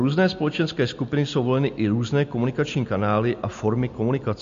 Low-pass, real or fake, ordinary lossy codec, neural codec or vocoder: 7.2 kHz; real; MP3, 48 kbps; none